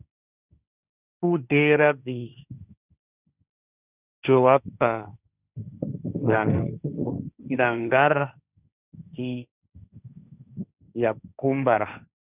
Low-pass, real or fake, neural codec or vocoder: 3.6 kHz; fake; codec, 16 kHz, 1.1 kbps, Voila-Tokenizer